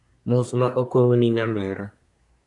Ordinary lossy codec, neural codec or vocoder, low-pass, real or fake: MP3, 96 kbps; codec, 24 kHz, 1 kbps, SNAC; 10.8 kHz; fake